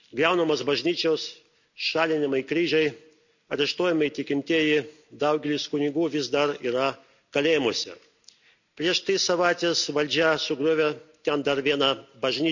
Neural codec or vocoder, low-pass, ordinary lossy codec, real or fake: none; 7.2 kHz; none; real